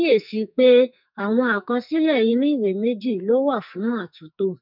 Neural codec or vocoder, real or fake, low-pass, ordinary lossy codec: codec, 44.1 kHz, 2.6 kbps, SNAC; fake; 5.4 kHz; none